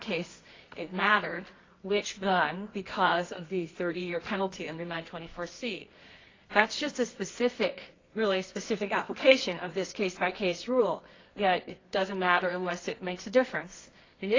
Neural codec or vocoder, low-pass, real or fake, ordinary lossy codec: codec, 24 kHz, 0.9 kbps, WavTokenizer, medium music audio release; 7.2 kHz; fake; AAC, 32 kbps